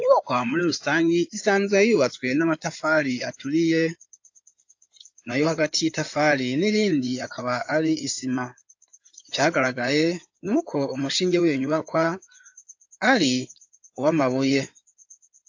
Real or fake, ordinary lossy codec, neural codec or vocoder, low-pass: fake; AAC, 48 kbps; codec, 16 kHz in and 24 kHz out, 2.2 kbps, FireRedTTS-2 codec; 7.2 kHz